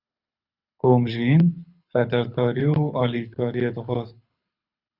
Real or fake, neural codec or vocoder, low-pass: fake; codec, 24 kHz, 6 kbps, HILCodec; 5.4 kHz